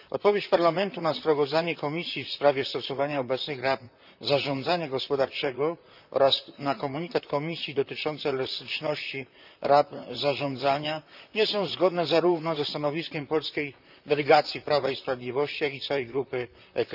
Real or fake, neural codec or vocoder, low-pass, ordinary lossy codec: fake; vocoder, 44.1 kHz, 128 mel bands, Pupu-Vocoder; 5.4 kHz; none